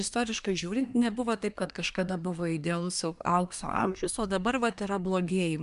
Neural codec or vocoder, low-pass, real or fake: codec, 24 kHz, 1 kbps, SNAC; 10.8 kHz; fake